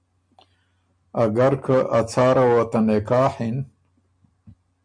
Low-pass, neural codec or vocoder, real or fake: 9.9 kHz; none; real